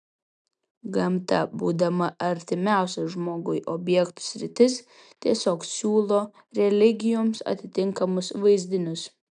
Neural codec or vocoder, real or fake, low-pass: none; real; 9.9 kHz